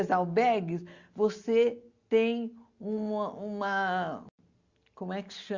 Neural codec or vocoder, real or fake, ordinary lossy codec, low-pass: none; real; Opus, 64 kbps; 7.2 kHz